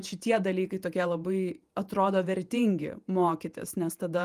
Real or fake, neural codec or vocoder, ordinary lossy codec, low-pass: fake; vocoder, 48 kHz, 128 mel bands, Vocos; Opus, 32 kbps; 14.4 kHz